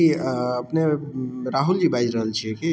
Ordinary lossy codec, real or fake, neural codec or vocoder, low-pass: none; real; none; none